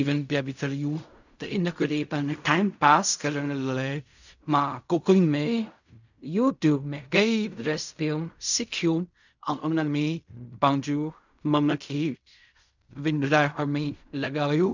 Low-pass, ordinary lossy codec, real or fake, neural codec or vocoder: 7.2 kHz; none; fake; codec, 16 kHz in and 24 kHz out, 0.4 kbps, LongCat-Audio-Codec, fine tuned four codebook decoder